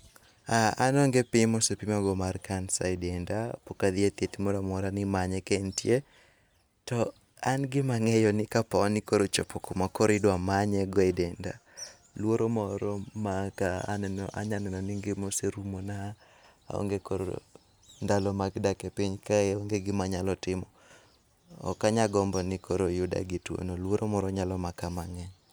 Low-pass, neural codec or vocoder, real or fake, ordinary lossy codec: none; none; real; none